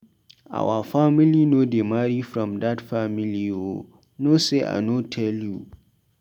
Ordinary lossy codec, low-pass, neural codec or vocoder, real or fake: none; 19.8 kHz; none; real